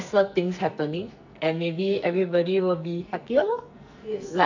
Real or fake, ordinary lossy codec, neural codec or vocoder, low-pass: fake; none; codec, 44.1 kHz, 2.6 kbps, SNAC; 7.2 kHz